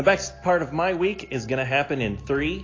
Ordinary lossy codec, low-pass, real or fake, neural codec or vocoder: AAC, 32 kbps; 7.2 kHz; real; none